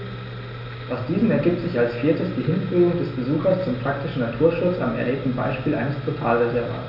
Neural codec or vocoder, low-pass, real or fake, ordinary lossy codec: none; 5.4 kHz; real; none